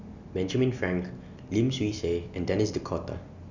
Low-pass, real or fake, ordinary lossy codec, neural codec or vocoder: 7.2 kHz; real; none; none